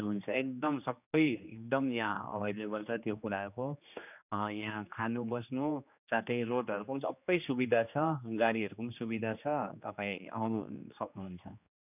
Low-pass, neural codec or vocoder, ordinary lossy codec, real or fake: 3.6 kHz; codec, 16 kHz, 2 kbps, X-Codec, HuBERT features, trained on general audio; none; fake